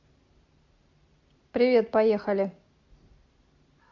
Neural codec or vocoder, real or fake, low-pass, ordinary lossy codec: none; real; 7.2 kHz; MP3, 64 kbps